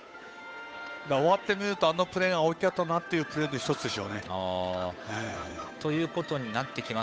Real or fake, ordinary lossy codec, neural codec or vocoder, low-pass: fake; none; codec, 16 kHz, 8 kbps, FunCodec, trained on Chinese and English, 25 frames a second; none